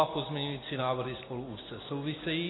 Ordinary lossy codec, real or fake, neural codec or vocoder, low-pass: AAC, 16 kbps; real; none; 7.2 kHz